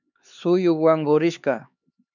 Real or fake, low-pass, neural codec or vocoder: fake; 7.2 kHz; codec, 16 kHz, 4 kbps, X-Codec, HuBERT features, trained on LibriSpeech